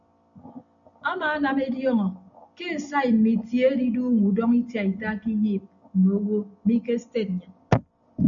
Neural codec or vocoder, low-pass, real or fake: none; 7.2 kHz; real